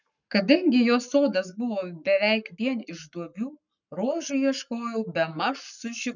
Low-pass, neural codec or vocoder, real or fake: 7.2 kHz; codec, 44.1 kHz, 7.8 kbps, Pupu-Codec; fake